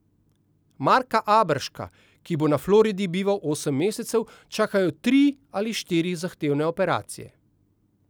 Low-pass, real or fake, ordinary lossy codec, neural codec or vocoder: none; real; none; none